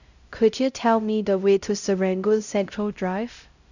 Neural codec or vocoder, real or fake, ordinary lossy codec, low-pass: codec, 16 kHz, 0.5 kbps, X-Codec, HuBERT features, trained on LibriSpeech; fake; none; 7.2 kHz